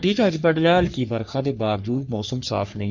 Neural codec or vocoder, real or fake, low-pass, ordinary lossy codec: codec, 44.1 kHz, 3.4 kbps, Pupu-Codec; fake; 7.2 kHz; none